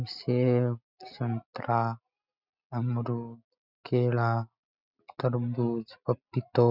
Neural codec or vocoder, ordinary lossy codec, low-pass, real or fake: none; none; 5.4 kHz; real